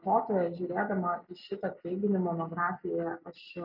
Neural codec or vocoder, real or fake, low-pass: none; real; 5.4 kHz